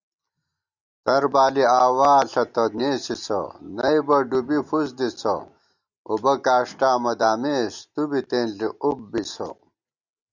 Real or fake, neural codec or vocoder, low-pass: real; none; 7.2 kHz